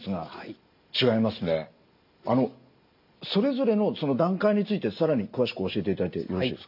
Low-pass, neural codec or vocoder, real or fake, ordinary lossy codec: 5.4 kHz; none; real; none